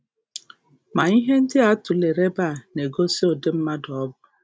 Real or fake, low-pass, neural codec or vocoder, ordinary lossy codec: real; none; none; none